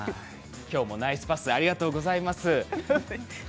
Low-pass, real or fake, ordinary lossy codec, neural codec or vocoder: none; real; none; none